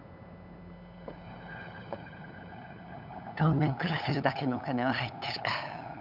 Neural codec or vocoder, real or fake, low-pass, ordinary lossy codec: codec, 16 kHz, 8 kbps, FunCodec, trained on LibriTTS, 25 frames a second; fake; 5.4 kHz; none